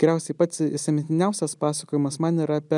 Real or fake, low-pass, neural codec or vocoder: real; 10.8 kHz; none